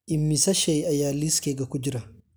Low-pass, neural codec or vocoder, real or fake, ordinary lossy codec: none; none; real; none